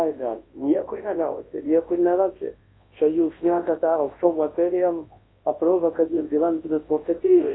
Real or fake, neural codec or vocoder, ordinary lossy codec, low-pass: fake; codec, 24 kHz, 0.9 kbps, WavTokenizer, large speech release; AAC, 16 kbps; 7.2 kHz